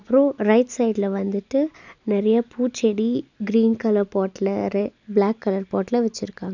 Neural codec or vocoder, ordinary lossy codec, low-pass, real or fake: none; none; 7.2 kHz; real